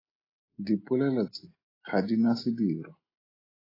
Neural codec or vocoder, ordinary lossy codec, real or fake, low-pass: none; AAC, 24 kbps; real; 5.4 kHz